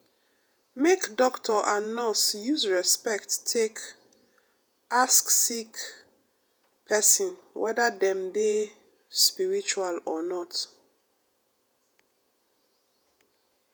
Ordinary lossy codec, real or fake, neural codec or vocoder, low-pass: none; fake; vocoder, 48 kHz, 128 mel bands, Vocos; none